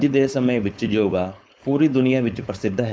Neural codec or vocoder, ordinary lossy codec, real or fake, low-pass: codec, 16 kHz, 4.8 kbps, FACodec; none; fake; none